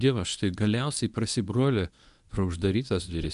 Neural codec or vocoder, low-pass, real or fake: codec, 24 kHz, 0.9 kbps, WavTokenizer, medium speech release version 1; 10.8 kHz; fake